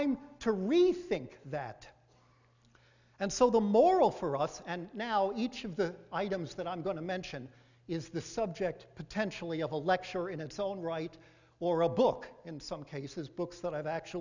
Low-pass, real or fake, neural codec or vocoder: 7.2 kHz; real; none